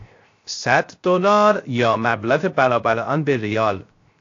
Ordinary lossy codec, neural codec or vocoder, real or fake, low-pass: AAC, 48 kbps; codec, 16 kHz, 0.3 kbps, FocalCodec; fake; 7.2 kHz